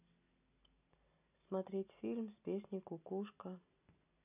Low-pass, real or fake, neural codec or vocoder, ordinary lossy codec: 3.6 kHz; real; none; none